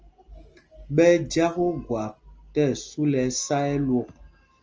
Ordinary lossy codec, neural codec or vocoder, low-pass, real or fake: Opus, 24 kbps; none; 7.2 kHz; real